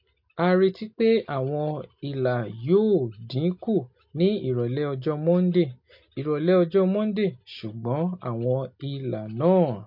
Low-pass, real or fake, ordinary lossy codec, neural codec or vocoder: 5.4 kHz; real; MP3, 32 kbps; none